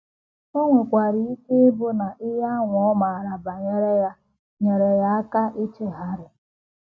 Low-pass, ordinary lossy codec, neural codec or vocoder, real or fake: none; none; none; real